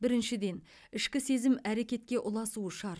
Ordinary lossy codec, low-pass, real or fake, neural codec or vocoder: none; none; real; none